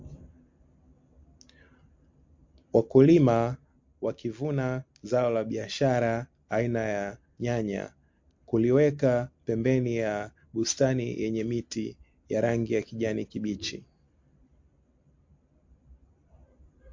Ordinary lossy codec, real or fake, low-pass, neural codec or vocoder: MP3, 48 kbps; real; 7.2 kHz; none